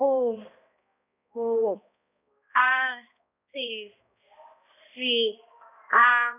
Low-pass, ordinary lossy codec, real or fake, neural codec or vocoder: 3.6 kHz; none; fake; codec, 16 kHz, 1 kbps, X-Codec, HuBERT features, trained on balanced general audio